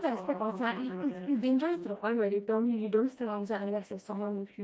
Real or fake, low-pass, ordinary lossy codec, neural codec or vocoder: fake; none; none; codec, 16 kHz, 1 kbps, FreqCodec, smaller model